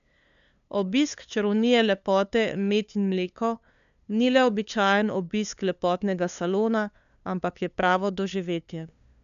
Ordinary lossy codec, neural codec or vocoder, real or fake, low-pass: none; codec, 16 kHz, 2 kbps, FunCodec, trained on LibriTTS, 25 frames a second; fake; 7.2 kHz